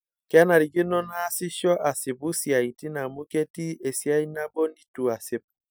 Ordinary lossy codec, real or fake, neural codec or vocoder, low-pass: none; real; none; none